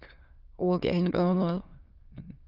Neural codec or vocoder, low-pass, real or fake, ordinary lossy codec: autoencoder, 22.05 kHz, a latent of 192 numbers a frame, VITS, trained on many speakers; 5.4 kHz; fake; Opus, 32 kbps